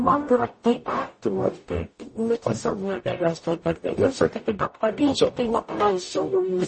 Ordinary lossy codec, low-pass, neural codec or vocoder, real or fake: MP3, 32 kbps; 10.8 kHz; codec, 44.1 kHz, 0.9 kbps, DAC; fake